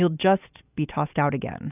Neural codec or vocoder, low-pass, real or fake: none; 3.6 kHz; real